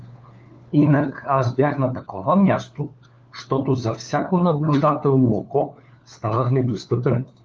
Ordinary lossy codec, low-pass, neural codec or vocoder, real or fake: Opus, 24 kbps; 7.2 kHz; codec, 16 kHz, 4 kbps, FunCodec, trained on LibriTTS, 50 frames a second; fake